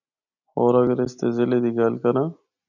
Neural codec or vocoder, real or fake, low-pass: none; real; 7.2 kHz